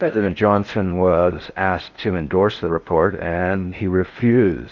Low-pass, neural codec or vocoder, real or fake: 7.2 kHz; codec, 16 kHz in and 24 kHz out, 0.6 kbps, FocalCodec, streaming, 4096 codes; fake